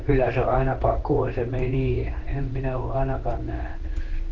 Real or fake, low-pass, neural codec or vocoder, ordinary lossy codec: fake; 7.2 kHz; codec, 16 kHz in and 24 kHz out, 1 kbps, XY-Tokenizer; Opus, 16 kbps